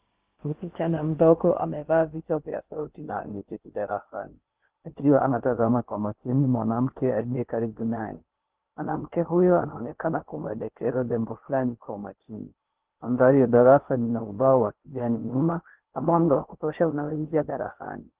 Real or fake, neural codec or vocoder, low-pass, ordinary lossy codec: fake; codec, 16 kHz in and 24 kHz out, 0.6 kbps, FocalCodec, streaming, 2048 codes; 3.6 kHz; Opus, 16 kbps